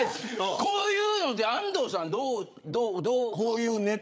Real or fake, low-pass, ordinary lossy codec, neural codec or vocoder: fake; none; none; codec, 16 kHz, 8 kbps, FreqCodec, larger model